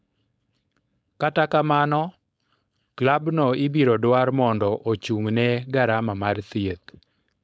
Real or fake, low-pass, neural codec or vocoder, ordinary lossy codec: fake; none; codec, 16 kHz, 4.8 kbps, FACodec; none